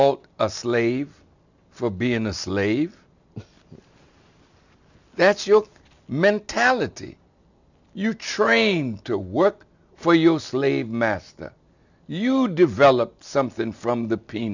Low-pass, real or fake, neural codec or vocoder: 7.2 kHz; real; none